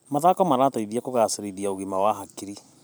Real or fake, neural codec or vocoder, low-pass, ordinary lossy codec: fake; vocoder, 44.1 kHz, 128 mel bands every 512 samples, BigVGAN v2; none; none